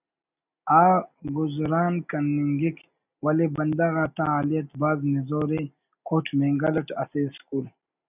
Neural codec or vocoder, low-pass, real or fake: none; 3.6 kHz; real